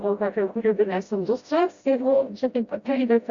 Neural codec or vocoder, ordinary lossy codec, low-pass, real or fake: codec, 16 kHz, 0.5 kbps, FreqCodec, smaller model; Opus, 64 kbps; 7.2 kHz; fake